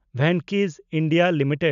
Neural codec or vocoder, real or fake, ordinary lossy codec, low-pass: none; real; none; 7.2 kHz